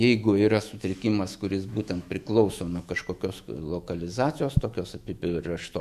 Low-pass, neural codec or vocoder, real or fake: 14.4 kHz; autoencoder, 48 kHz, 128 numbers a frame, DAC-VAE, trained on Japanese speech; fake